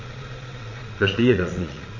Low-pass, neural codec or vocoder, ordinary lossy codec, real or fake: 7.2 kHz; vocoder, 22.05 kHz, 80 mel bands, Vocos; MP3, 32 kbps; fake